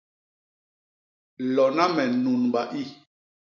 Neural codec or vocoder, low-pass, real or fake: none; 7.2 kHz; real